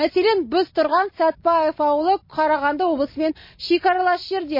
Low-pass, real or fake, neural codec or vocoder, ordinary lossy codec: 5.4 kHz; real; none; MP3, 24 kbps